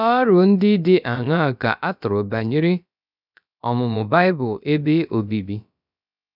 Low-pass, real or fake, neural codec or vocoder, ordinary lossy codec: 5.4 kHz; fake; codec, 16 kHz, about 1 kbps, DyCAST, with the encoder's durations; none